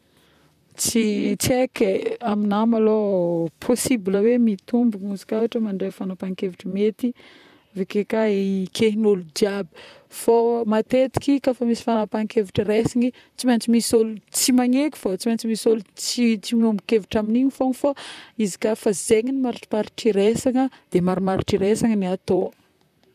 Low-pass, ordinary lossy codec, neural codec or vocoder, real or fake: 14.4 kHz; none; vocoder, 44.1 kHz, 128 mel bands, Pupu-Vocoder; fake